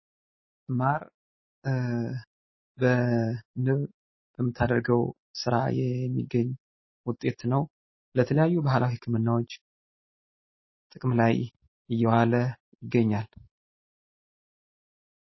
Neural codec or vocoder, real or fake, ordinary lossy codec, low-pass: none; real; MP3, 24 kbps; 7.2 kHz